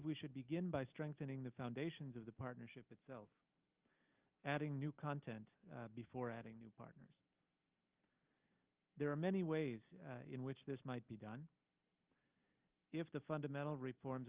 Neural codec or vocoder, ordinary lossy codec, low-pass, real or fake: none; Opus, 24 kbps; 3.6 kHz; real